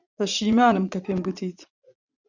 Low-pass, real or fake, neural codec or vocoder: 7.2 kHz; fake; vocoder, 44.1 kHz, 80 mel bands, Vocos